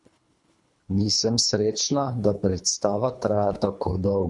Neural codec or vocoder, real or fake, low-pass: codec, 24 kHz, 3 kbps, HILCodec; fake; 10.8 kHz